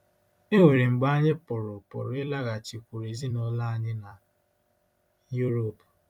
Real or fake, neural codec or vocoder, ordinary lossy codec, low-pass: fake; vocoder, 44.1 kHz, 128 mel bands every 256 samples, BigVGAN v2; none; 19.8 kHz